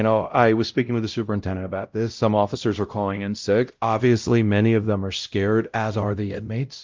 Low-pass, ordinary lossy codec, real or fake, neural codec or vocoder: 7.2 kHz; Opus, 24 kbps; fake; codec, 16 kHz, 0.5 kbps, X-Codec, WavLM features, trained on Multilingual LibriSpeech